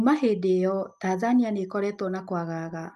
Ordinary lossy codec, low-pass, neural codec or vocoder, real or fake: Opus, 32 kbps; 14.4 kHz; none; real